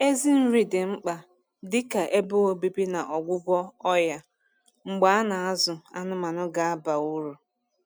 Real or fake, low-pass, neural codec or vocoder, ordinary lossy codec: real; none; none; none